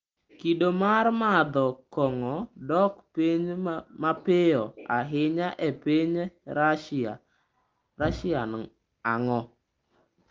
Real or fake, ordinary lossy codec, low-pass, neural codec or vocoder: real; Opus, 24 kbps; 7.2 kHz; none